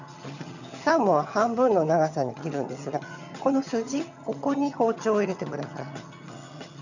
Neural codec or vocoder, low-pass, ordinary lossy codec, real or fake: vocoder, 22.05 kHz, 80 mel bands, HiFi-GAN; 7.2 kHz; none; fake